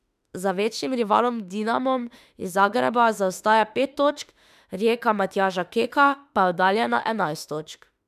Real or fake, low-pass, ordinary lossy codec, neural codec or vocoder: fake; 14.4 kHz; none; autoencoder, 48 kHz, 32 numbers a frame, DAC-VAE, trained on Japanese speech